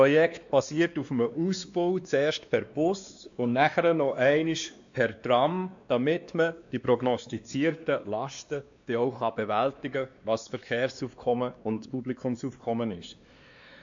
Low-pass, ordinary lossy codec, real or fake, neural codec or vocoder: 7.2 kHz; AAC, 64 kbps; fake; codec, 16 kHz, 2 kbps, X-Codec, WavLM features, trained on Multilingual LibriSpeech